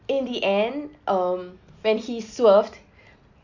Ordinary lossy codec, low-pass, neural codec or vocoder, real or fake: none; 7.2 kHz; none; real